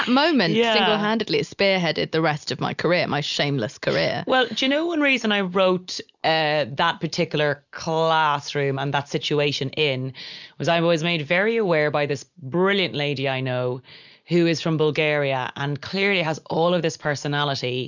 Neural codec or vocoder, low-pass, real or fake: none; 7.2 kHz; real